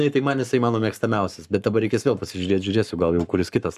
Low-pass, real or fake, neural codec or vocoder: 14.4 kHz; fake; codec, 44.1 kHz, 7.8 kbps, Pupu-Codec